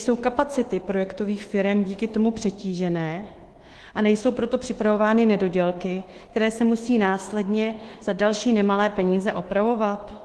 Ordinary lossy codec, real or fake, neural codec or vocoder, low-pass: Opus, 16 kbps; fake; codec, 24 kHz, 1.2 kbps, DualCodec; 10.8 kHz